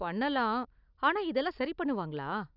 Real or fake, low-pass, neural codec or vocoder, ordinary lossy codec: real; 5.4 kHz; none; none